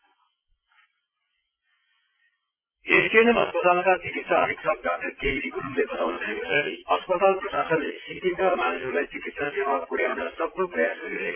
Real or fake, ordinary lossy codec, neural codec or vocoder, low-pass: fake; MP3, 24 kbps; vocoder, 44.1 kHz, 80 mel bands, Vocos; 3.6 kHz